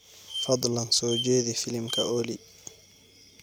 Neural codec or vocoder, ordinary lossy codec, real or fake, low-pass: none; none; real; none